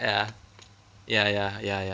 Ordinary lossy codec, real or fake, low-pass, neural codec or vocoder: none; real; none; none